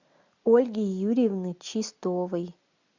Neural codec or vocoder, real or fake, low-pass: none; real; 7.2 kHz